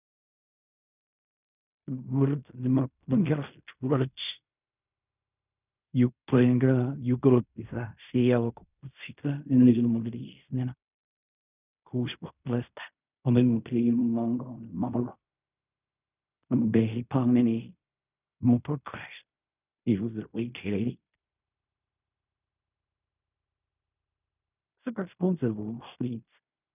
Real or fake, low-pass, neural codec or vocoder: fake; 3.6 kHz; codec, 16 kHz in and 24 kHz out, 0.4 kbps, LongCat-Audio-Codec, fine tuned four codebook decoder